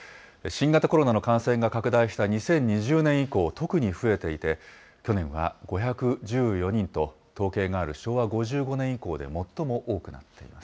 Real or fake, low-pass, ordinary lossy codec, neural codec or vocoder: real; none; none; none